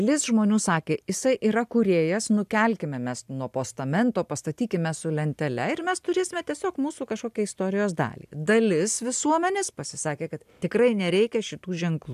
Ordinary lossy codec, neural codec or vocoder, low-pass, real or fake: AAC, 96 kbps; none; 14.4 kHz; real